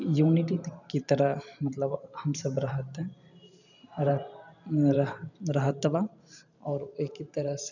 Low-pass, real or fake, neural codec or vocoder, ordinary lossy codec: 7.2 kHz; fake; vocoder, 44.1 kHz, 128 mel bands every 512 samples, BigVGAN v2; none